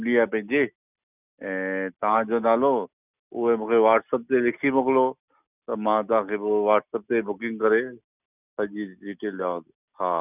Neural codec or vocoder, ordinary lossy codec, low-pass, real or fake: none; none; 3.6 kHz; real